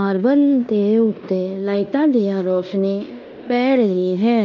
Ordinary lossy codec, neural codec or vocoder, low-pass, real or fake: none; codec, 16 kHz in and 24 kHz out, 0.9 kbps, LongCat-Audio-Codec, fine tuned four codebook decoder; 7.2 kHz; fake